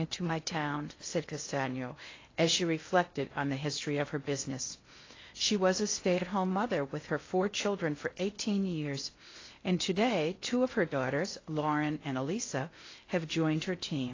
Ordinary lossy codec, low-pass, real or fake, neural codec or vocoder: AAC, 32 kbps; 7.2 kHz; fake; codec, 16 kHz in and 24 kHz out, 0.6 kbps, FocalCodec, streaming, 2048 codes